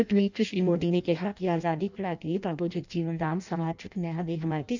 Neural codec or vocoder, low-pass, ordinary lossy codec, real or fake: codec, 16 kHz in and 24 kHz out, 0.6 kbps, FireRedTTS-2 codec; 7.2 kHz; none; fake